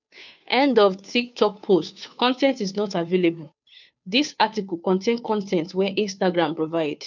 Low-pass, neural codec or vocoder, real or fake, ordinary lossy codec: 7.2 kHz; codec, 16 kHz, 2 kbps, FunCodec, trained on Chinese and English, 25 frames a second; fake; none